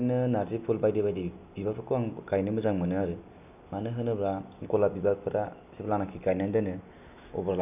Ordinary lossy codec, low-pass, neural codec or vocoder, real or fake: none; 3.6 kHz; none; real